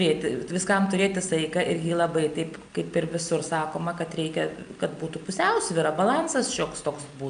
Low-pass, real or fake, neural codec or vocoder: 9.9 kHz; real; none